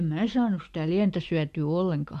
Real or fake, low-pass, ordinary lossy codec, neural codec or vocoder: real; 14.4 kHz; MP3, 64 kbps; none